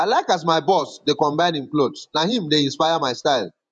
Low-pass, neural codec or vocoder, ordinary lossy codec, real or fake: 10.8 kHz; vocoder, 48 kHz, 128 mel bands, Vocos; none; fake